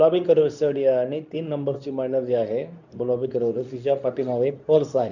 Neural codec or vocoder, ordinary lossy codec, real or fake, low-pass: codec, 24 kHz, 0.9 kbps, WavTokenizer, medium speech release version 2; none; fake; 7.2 kHz